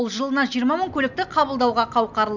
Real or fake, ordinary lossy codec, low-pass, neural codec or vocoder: real; none; 7.2 kHz; none